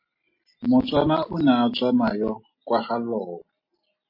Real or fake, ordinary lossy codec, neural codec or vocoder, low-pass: real; MP3, 24 kbps; none; 5.4 kHz